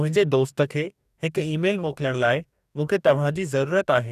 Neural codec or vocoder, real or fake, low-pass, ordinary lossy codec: codec, 44.1 kHz, 2.6 kbps, DAC; fake; 14.4 kHz; none